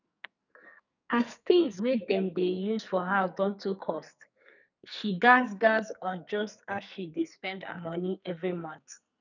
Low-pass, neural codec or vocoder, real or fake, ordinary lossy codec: 7.2 kHz; codec, 44.1 kHz, 2.6 kbps, SNAC; fake; none